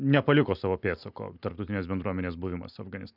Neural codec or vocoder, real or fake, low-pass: none; real; 5.4 kHz